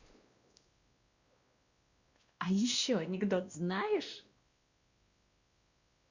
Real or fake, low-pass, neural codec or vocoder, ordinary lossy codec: fake; 7.2 kHz; codec, 16 kHz, 1 kbps, X-Codec, WavLM features, trained on Multilingual LibriSpeech; Opus, 64 kbps